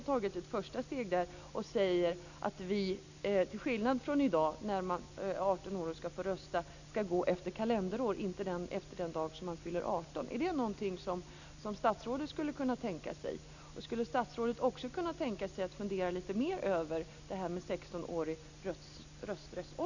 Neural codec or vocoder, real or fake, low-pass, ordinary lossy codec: none; real; 7.2 kHz; none